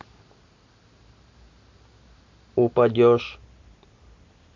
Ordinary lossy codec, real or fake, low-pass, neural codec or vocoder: MP3, 48 kbps; real; 7.2 kHz; none